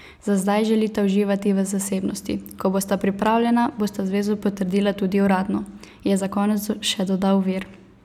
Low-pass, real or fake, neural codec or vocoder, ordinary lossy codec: 19.8 kHz; real; none; none